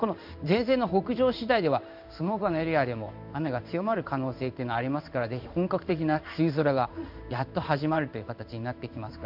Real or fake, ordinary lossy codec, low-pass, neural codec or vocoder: fake; none; 5.4 kHz; codec, 16 kHz in and 24 kHz out, 1 kbps, XY-Tokenizer